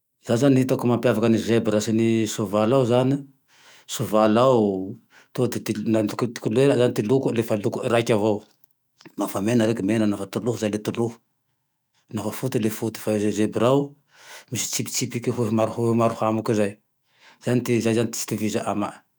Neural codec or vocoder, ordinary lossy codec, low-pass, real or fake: autoencoder, 48 kHz, 128 numbers a frame, DAC-VAE, trained on Japanese speech; none; none; fake